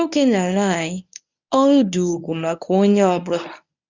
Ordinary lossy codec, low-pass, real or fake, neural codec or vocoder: none; 7.2 kHz; fake; codec, 24 kHz, 0.9 kbps, WavTokenizer, medium speech release version 1